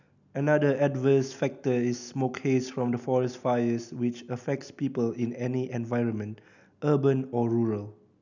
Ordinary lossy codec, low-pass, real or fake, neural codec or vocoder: none; 7.2 kHz; real; none